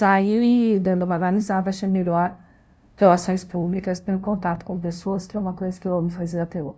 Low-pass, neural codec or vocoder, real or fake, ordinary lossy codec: none; codec, 16 kHz, 0.5 kbps, FunCodec, trained on LibriTTS, 25 frames a second; fake; none